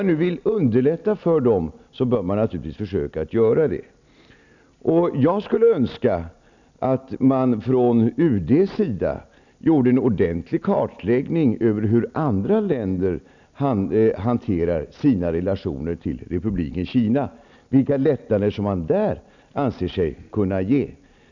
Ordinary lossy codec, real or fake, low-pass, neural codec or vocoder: none; real; 7.2 kHz; none